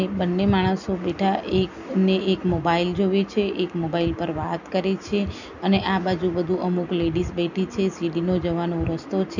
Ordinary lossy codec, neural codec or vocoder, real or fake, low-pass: none; none; real; 7.2 kHz